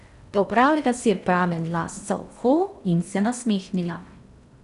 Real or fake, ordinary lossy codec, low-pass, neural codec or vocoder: fake; none; 10.8 kHz; codec, 16 kHz in and 24 kHz out, 0.6 kbps, FocalCodec, streaming, 2048 codes